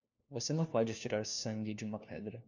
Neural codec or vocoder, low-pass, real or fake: codec, 16 kHz, 1 kbps, FunCodec, trained on LibriTTS, 50 frames a second; 7.2 kHz; fake